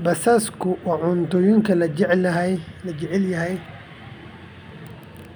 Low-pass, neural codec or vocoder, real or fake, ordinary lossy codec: none; none; real; none